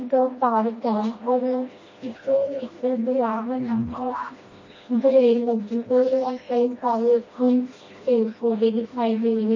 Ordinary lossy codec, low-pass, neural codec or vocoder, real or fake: MP3, 32 kbps; 7.2 kHz; codec, 16 kHz, 1 kbps, FreqCodec, smaller model; fake